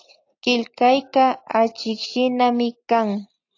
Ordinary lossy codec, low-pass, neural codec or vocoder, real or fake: AAC, 48 kbps; 7.2 kHz; none; real